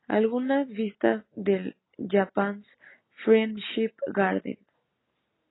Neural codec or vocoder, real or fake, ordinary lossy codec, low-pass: none; real; AAC, 16 kbps; 7.2 kHz